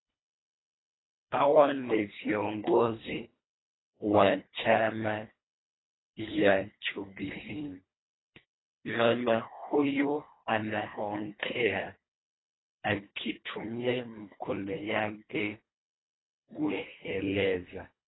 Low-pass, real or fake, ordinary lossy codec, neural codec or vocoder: 7.2 kHz; fake; AAC, 16 kbps; codec, 24 kHz, 1.5 kbps, HILCodec